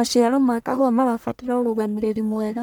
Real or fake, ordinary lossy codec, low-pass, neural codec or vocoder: fake; none; none; codec, 44.1 kHz, 1.7 kbps, Pupu-Codec